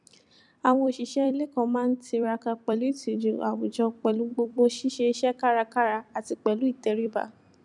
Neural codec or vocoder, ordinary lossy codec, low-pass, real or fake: vocoder, 44.1 kHz, 128 mel bands every 256 samples, BigVGAN v2; none; 10.8 kHz; fake